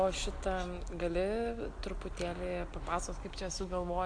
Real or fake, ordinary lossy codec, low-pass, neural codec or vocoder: real; MP3, 64 kbps; 9.9 kHz; none